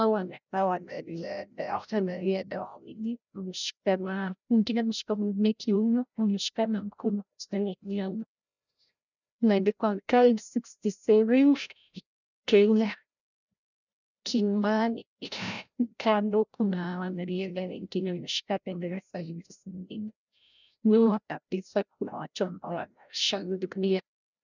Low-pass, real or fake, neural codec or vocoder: 7.2 kHz; fake; codec, 16 kHz, 0.5 kbps, FreqCodec, larger model